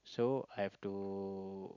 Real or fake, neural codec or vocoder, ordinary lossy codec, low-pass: real; none; none; 7.2 kHz